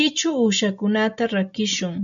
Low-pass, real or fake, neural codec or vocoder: 7.2 kHz; real; none